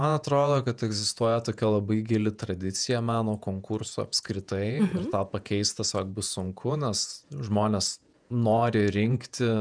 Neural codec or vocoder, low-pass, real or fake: vocoder, 48 kHz, 128 mel bands, Vocos; 9.9 kHz; fake